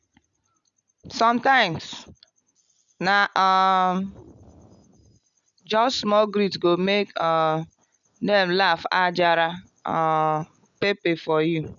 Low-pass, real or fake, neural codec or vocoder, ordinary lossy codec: 7.2 kHz; real; none; none